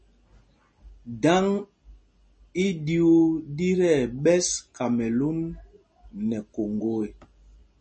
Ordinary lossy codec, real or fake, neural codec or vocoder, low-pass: MP3, 32 kbps; real; none; 10.8 kHz